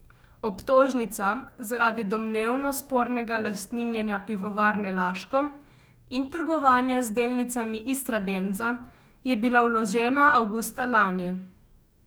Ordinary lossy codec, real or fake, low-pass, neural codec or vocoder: none; fake; none; codec, 44.1 kHz, 2.6 kbps, DAC